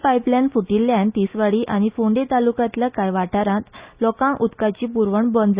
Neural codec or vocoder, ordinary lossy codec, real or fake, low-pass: none; Opus, 64 kbps; real; 3.6 kHz